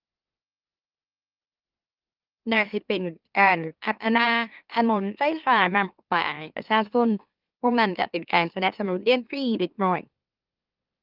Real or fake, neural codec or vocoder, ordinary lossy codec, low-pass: fake; autoencoder, 44.1 kHz, a latent of 192 numbers a frame, MeloTTS; Opus, 32 kbps; 5.4 kHz